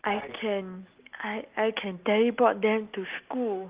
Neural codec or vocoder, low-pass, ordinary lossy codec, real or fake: none; 3.6 kHz; Opus, 32 kbps; real